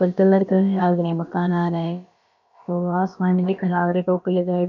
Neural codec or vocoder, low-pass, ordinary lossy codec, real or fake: codec, 16 kHz, about 1 kbps, DyCAST, with the encoder's durations; 7.2 kHz; none; fake